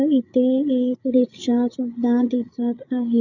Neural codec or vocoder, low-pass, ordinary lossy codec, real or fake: codec, 16 kHz, 8 kbps, FreqCodec, larger model; 7.2 kHz; none; fake